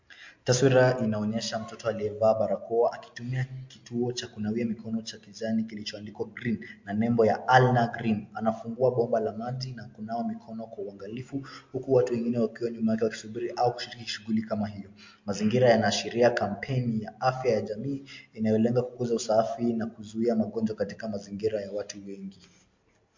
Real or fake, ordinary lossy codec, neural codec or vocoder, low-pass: real; MP3, 48 kbps; none; 7.2 kHz